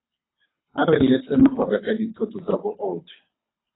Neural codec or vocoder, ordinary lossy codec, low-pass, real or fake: codec, 24 kHz, 3 kbps, HILCodec; AAC, 16 kbps; 7.2 kHz; fake